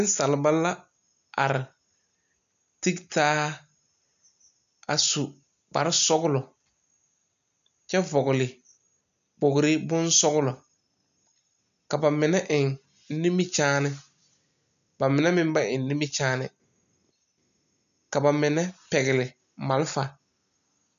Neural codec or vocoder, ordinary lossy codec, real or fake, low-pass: none; MP3, 96 kbps; real; 7.2 kHz